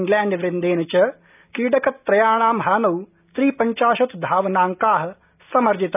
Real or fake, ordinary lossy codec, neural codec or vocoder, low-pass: real; none; none; 3.6 kHz